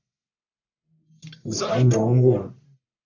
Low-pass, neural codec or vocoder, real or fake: 7.2 kHz; codec, 44.1 kHz, 1.7 kbps, Pupu-Codec; fake